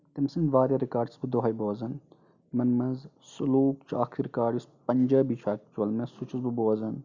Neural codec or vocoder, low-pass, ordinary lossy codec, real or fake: none; 7.2 kHz; none; real